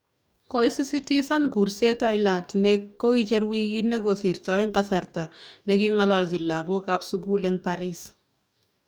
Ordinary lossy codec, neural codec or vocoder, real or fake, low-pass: none; codec, 44.1 kHz, 2.6 kbps, DAC; fake; none